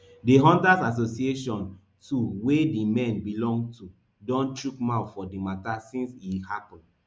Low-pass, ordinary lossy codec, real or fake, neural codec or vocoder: none; none; real; none